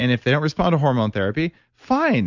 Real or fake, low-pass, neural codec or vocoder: real; 7.2 kHz; none